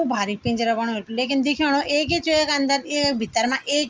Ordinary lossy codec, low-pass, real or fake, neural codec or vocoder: Opus, 24 kbps; 7.2 kHz; real; none